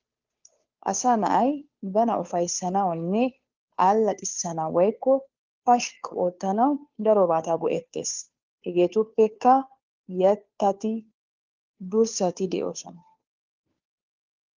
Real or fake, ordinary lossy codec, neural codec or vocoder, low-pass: fake; Opus, 32 kbps; codec, 16 kHz, 2 kbps, FunCodec, trained on Chinese and English, 25 frames a second; 7.2 kHz